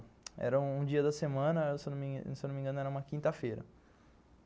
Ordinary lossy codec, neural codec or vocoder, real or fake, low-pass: none; none; real; none